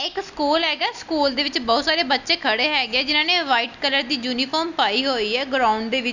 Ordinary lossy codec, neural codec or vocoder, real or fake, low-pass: none; none; real; 7.2 kHz